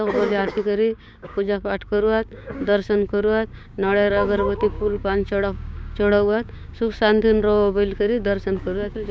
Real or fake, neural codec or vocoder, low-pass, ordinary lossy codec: fake; codec, 16 kHz, 6 kbps, DAC; none; none